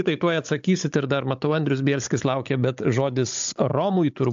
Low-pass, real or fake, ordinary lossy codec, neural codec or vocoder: 7.2 kHz; fake; AAC, 64 kbps; codec, 16 kHz, 16 kbps, FunCodec, trained on Chinese and English, 50 frames a second